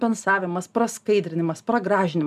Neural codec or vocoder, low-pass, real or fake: none; 14.4 kHz; real